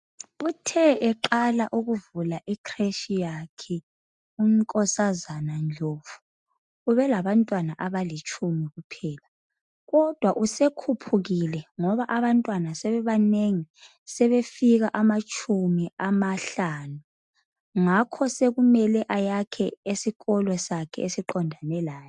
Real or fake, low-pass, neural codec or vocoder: real; 10.8 kHz; none